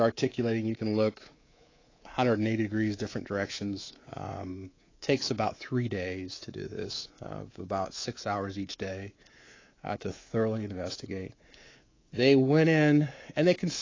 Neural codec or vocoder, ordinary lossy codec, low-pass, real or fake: codec, 24 kHz, 3.1 kbps, DualCodec; AAC, 32 kbps; 7.2 kHz; fake